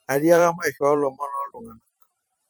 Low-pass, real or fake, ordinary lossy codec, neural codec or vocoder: none; fake; none; vocoder, 44.1 kHz, 128 mel bands every 512 samples, BigVGAN v2